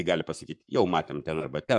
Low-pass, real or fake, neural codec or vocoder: 10.8 kHz; fake; codec, 44.1 kHz, 7.8 kbps, Pupu-Codec